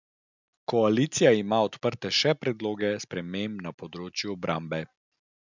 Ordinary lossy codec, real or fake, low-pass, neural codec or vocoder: none; real; 7.2 kHz; none